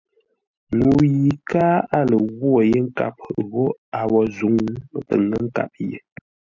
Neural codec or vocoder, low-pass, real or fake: none; 7.2 kHz; real